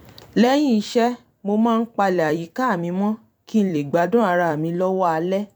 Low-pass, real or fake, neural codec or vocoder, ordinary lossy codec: 19.8 kHz; fake; vocoder, 44.1 kHz, 128 mel bands every 256 samples, BigVGAN v2; none